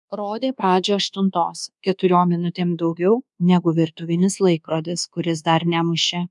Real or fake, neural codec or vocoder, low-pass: fake; codec, 24 kHz, 1.2 kbps, DualCodec; 10.8 kHz